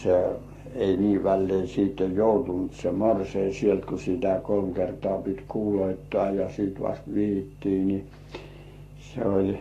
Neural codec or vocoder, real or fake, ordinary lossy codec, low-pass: codec, 44.1 kHz, 7.8 kbps, Pupu-Codec; fake; AAC, 48 kbps; 14.4 kHz